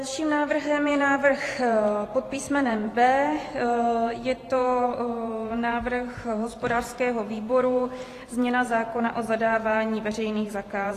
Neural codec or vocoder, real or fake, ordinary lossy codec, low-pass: vocoder, 44.1 kHz, 128 mel bands every 512 samples, BigVGAN v2; fake; AAC, 48 kbps; 14.4 kHz